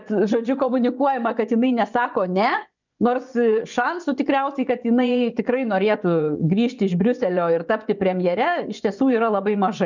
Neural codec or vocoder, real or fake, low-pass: vocoder, 24 kHz, 100 mel bands, Vocos; fake; 7.2 kHz